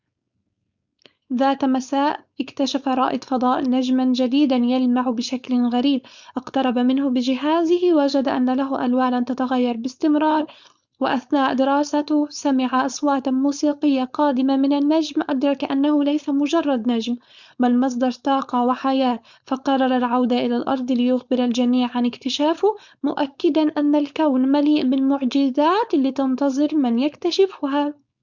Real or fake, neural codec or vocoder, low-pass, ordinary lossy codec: fake; codec, 16 kHz, 4.8 kbps, FACodec; 7.2 kHz; Opus, 64 kbps